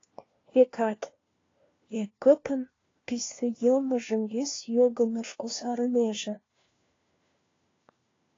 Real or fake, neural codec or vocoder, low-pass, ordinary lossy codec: fake; codec, 16 kHz, 1 kbps, FunCodec, trained on LibriTTS, 50 frames a second; 7.2 kHz; AAC, 32 kbps